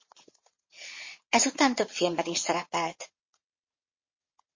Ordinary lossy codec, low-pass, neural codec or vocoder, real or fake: MP3, 32 kbps; 7.2 kHz; vocoder, 22.05 kHz, 80 mel bands, WaveNeXt; fake